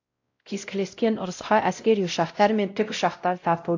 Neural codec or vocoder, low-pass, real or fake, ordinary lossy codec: codec, 16 kHz, 0.5 kbps, X-Codec, WavLM features, trained on Multilingual LibriSpeech; 7.2 kHz; fake; AAC, 48 kbps